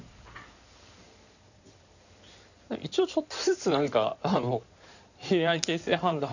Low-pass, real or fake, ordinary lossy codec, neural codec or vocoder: 7.2 kHz; fake; none; codec, 16 kHz in and 24 kHz out, 2.2 kbps, FireRedTTS-2 codec